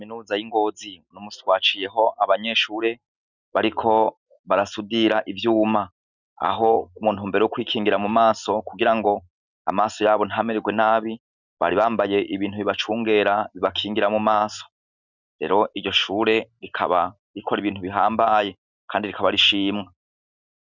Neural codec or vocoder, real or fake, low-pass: none; real; 7.2 kHz